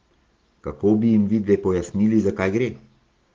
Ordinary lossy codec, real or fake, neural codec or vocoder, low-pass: Opus, 16 kbps; real; none; 7.2 kHz